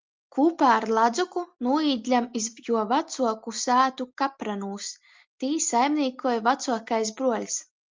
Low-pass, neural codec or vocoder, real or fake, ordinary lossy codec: 7.2 kHz; none; real; Opus, 32 kbps